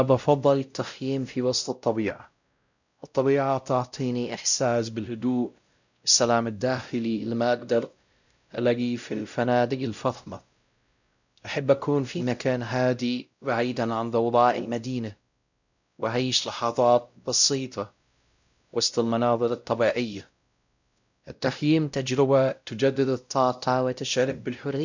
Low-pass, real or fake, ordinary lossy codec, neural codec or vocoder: 7.2 kHz; fake; none; codec, 16 kHz, 0.5 kbps, X-Codec, WavLM features, trained on Multilingual LibriSpeech